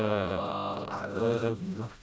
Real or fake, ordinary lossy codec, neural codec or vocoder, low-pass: fake; none; codec, 16 kHz, 0.5 kbps, FreqCodec, smaller model; none